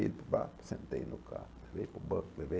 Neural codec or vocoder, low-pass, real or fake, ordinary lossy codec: none; none; real; none